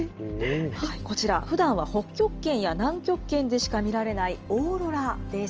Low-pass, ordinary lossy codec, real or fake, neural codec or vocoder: 7.2 kHz; Opus, 24 kbps; real; none